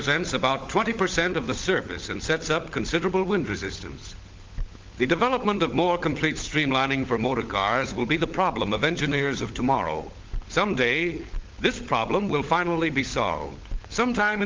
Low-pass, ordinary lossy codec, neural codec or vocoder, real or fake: 7.2 kHz; Opus, 32 kbps; codec, 16 kHz, 16 kbps, FunCodec, trained on LibriTTS, 50 frames a second; fake